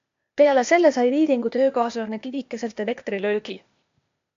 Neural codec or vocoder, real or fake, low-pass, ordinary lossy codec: codec, 16 kHz, 0.8 kbps, ZipCodec; fake; 7.2 kHz; AAC, 64 kbps